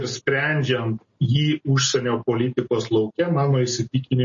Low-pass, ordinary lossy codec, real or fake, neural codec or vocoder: 7.2 kHz; MP3, 32 kbps; real; none